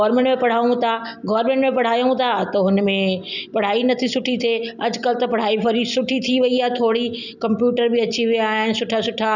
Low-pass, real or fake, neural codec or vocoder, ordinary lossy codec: 7.2 kHz; real; none; none